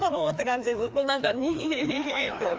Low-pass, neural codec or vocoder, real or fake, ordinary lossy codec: none; codec, 16 kHz, 2 kbps, FreqCodec, larger model; fake; none